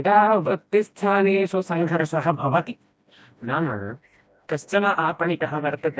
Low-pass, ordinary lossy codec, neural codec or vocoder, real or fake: none; none; codec, 16 kHz, 1 kbps, FreqCodec, smaller model; fake